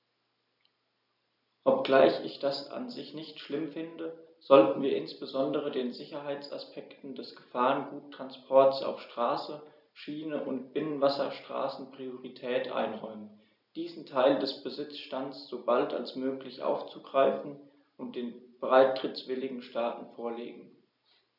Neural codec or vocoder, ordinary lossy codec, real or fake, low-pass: none; none; real; 5.4 kHz